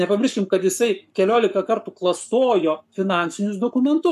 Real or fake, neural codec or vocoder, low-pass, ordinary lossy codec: fake; codec, 44.1 kHz, 7.8 kbps, Pupu-Codec; 14.4 kHz; MP3, 96 kbps